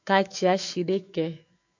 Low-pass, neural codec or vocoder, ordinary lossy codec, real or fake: 7.2 kHz; autoencoder, 48 kHz, 128 numbers a frame, DAC-VAE, trained on Japanese speech; AAC, 48 kbps; fake